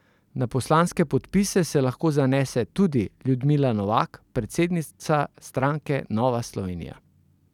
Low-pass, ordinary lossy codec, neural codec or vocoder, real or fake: 19.8 kHz; none; none; real